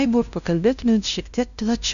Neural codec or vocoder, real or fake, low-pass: codec, 16 kHz, 0.5 kbps, FunCodec, trained on LibriTTS, 25 frames a second; fake; 7.2 kHz